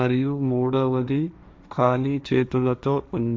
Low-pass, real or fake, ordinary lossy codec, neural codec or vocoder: none; fake; none; codec, 16 kHz, 1.1 kbps, Voila-Tokenizer